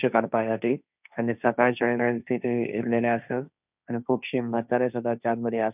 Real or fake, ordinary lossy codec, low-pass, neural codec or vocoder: fake; none; 3.6 kHz; codec, 16 kHz, 1.1 kbps, Voila-Tokenizer